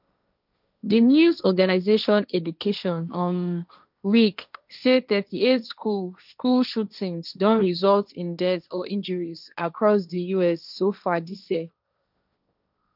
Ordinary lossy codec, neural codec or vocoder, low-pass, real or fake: none; codec, 16 kHz, 1.1 kbps, Voila-Tokenizer; 5.4 kHz; fake